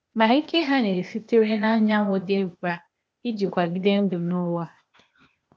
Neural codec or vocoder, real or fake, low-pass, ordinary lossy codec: codec, 16 kHz, 0.8 kbps, ZipCodec; fake; none; none